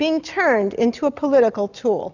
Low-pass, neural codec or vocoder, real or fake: 7.2 kHz; none; real